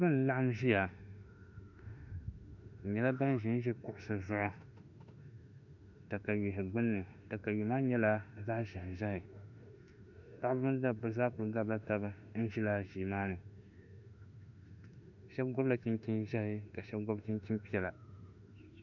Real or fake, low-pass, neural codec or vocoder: fake; 7.2 kHz; autoencoder, 48 kHz, 32 numbers a frame, DAC-VAE, trained on Japanese speech